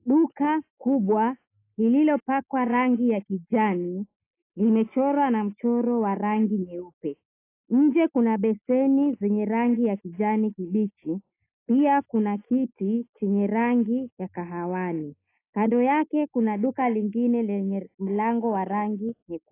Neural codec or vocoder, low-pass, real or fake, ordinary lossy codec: none; 3.6 kHz; real; AAC, 24 kbps